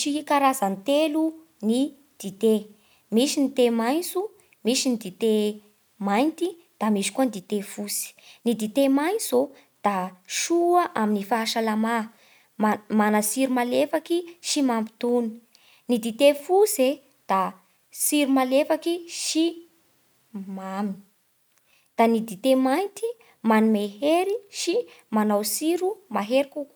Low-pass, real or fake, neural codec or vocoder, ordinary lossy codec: none; real; none; none